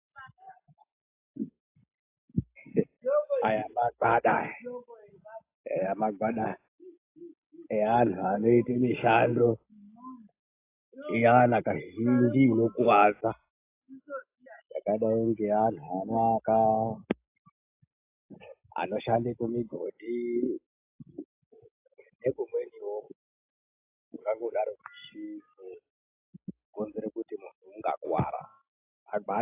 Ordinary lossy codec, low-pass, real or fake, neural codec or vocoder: AAC, 24 kbps; 3.6 kHz; real; none